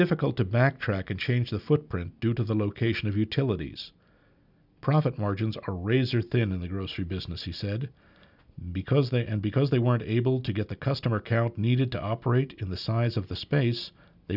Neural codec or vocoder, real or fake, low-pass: none; real; 5.4 kHz